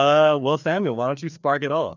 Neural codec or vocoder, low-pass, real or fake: codec, 16 kHz, 2 kbps, FreqCodec, larger model; 7.2 kHz; fake